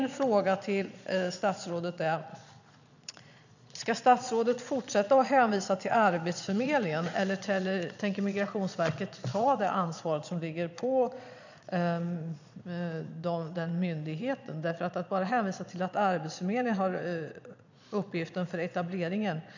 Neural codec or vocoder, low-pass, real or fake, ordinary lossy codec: vocoder, 22.05 kHz, 80 mel bands, Vocos; 7.2 kHz; fake; none